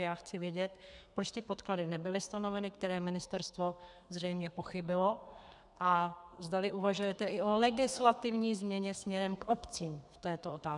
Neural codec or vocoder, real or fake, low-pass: codec, 32 kHz, 1.9 kbps, SNAC; fake; 10.8 kHz